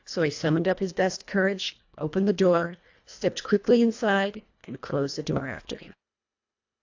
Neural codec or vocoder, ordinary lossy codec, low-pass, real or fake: codec, 24 kHz, 1.5 kbps, HILCodec; AAC, 48 kbps; 7.2 kHz; fake